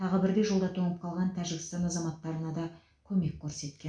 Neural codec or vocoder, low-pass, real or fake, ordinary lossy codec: none; 9.9 kHz; real; none